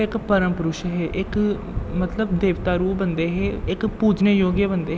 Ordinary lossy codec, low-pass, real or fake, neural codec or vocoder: none; none; real; none